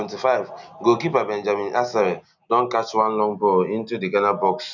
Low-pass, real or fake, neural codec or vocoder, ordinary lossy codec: 7.2 kHz; real; none; none